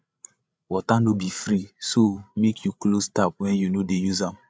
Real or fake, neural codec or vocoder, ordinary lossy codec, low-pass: fake; codec, 16 kHz, 8 kbps, FreqCodec, larger model; none; none